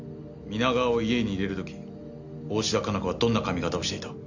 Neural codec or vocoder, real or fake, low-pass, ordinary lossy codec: none; real; 7.2 kHz; none